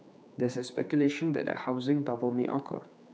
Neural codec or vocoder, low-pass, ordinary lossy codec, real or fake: codec, 16 kHz, 4 kbps, X-Codec, HuBERT features, trained on general audio; none; none; fake